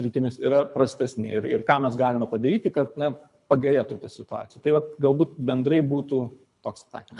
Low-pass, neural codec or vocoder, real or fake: 10.8 kHz; codec, 24 kHz, 3 kbps, HILCodec; fake